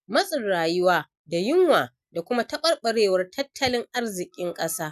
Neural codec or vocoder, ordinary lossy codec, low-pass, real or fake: none; none; 14.4 kHz; real